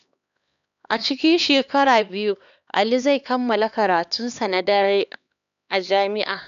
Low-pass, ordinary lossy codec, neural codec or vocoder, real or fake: 7.2 kHz; none; codec, 16 kHz, 2 kbps, X-Codec, HuBERT features, trained on LibriSpeech; fake